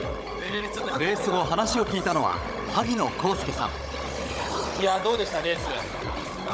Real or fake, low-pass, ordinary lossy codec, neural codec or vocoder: fake; none; none; codec, 16 kHz, 16 kbps, FunCodec, trained on Chinese and English, 50 frames a second